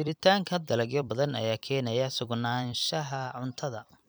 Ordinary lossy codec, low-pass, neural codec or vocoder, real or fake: none; none; vocoder, 44.1 kHz, 128 mel bands every 256 samples, BigVGAN v2; fake